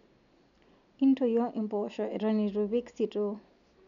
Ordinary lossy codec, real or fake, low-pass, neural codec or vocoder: none; real; 7.2 kHz; none